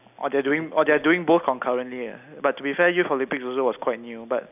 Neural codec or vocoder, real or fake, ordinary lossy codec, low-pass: none; real; none; 3.6 kHz